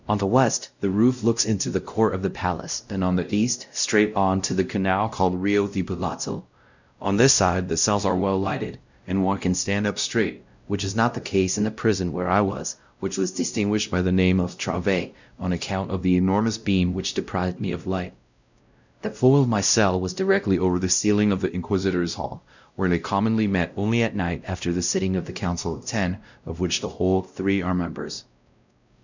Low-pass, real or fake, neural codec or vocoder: 7.2 kHz; fake; codec, 16 kHz, 0.5 kbps, X-Codec, WavLM features, trained on Multilingual LibriSpeech